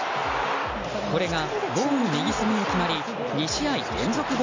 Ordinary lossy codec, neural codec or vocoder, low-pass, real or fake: MP3, 64 kbps; none; 7.2 kHz; real